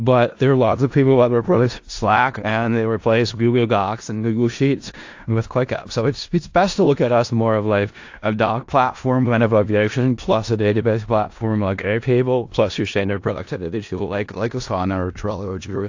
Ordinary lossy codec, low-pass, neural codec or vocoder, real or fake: AAC, 48 kbps; 7.2 kHz; codec, 16 kHz in and 24 kHz out, 0.4 kbps, LongCat-Audio-Codec, four codebook decoder; fake